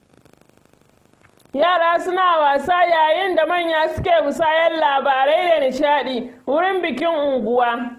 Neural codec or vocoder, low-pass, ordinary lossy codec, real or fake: none; 14.4 kHz; Opus, 16 kbps; real